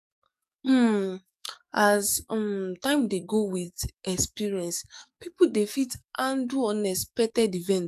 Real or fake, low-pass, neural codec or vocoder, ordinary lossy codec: fake; 14.4 kHz; codec, 44.1 kHz, 7.8 kbps, DAC; none